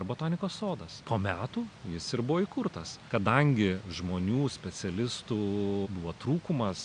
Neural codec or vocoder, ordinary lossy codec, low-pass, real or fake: none; MP3, 96 kbps; 9.9 kHz; real